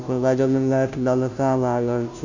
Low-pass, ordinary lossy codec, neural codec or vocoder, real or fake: 7.2 kHz; none; codec, 16 kHz, 0.5 kbps, FunCodec, trained on Chinese and English, 25 frames a second; fake